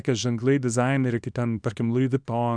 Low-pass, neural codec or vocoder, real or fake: 9.9 kHz; codec, 24 kHz, 0.9 kbps, WavTokenizer, small release; fake